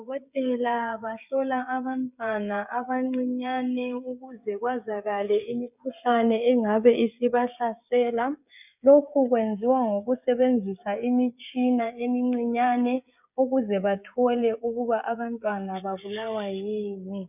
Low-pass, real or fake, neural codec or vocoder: 3.6 kHz; fake; codec, 16 kHz, 16 kbps, FreqCodec, smaller model